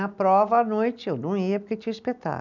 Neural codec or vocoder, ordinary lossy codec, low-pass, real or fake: none; none; 7.2 kHz; real